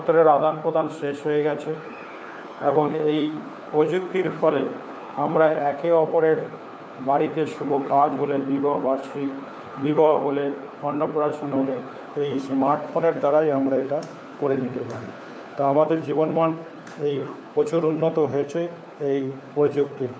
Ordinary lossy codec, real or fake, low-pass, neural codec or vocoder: none; fake; none; codec, 16 kHz, 4 kbps, FunCodec, trained on LibriTTS, 50 frames a second